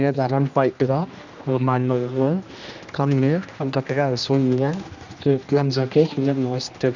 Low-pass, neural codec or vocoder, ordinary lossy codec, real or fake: 7.2 kHz; codec, 16 kHz, 1 kbps, X-Codec, HuBERT features, trained on general audio; none; fake